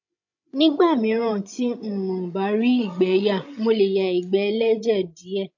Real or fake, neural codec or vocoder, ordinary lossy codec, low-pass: fake; codec, 16 kHz, 16 kbps, FreqCodec, larger model; AAC, 48 kbps; 7.2 kHz